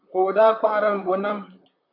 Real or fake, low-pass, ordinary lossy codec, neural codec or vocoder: fake; 5.4 kHz; AAC, 48 kbps; vocoder, 44.1 kHz, 128 mel bands, Pupu-Vocoder